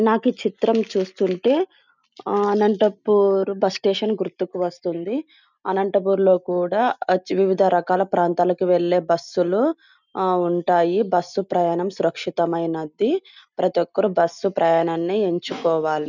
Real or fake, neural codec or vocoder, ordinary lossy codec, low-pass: real; none; none; 7.2 kHz